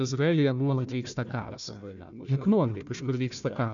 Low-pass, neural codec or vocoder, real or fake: 7.2 kHz; codec, 16 kHz, 1 kbps, FunCodec, trained on Chinese and English, 50 frames a second; fake